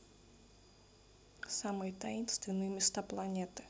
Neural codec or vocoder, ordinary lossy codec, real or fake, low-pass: none; none; real; none